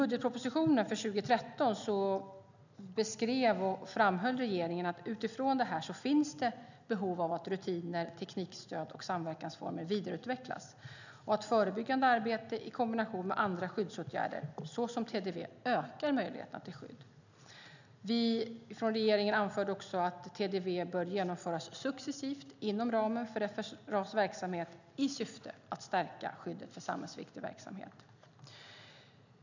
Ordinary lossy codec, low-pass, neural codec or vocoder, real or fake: none; 7.2 kHz; none; real